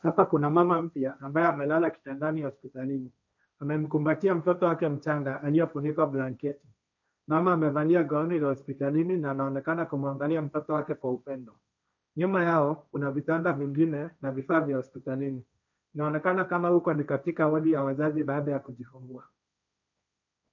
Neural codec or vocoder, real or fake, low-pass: codec, 16 kHz, 1.1 kbps, Voila-Tokenizer; fake; 7.2 kHz